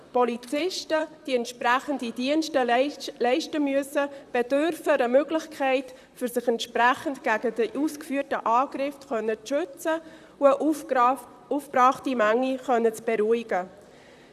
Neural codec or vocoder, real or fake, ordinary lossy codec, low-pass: vocoder, 44.1 kHz, 128 mel bands, Pupu-Vocoder; fake; none; 14.4 kHz